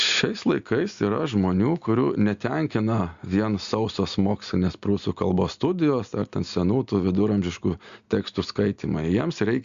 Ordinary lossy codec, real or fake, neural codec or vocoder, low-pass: Opus, 64 kbps; real; none; 7.2 kHz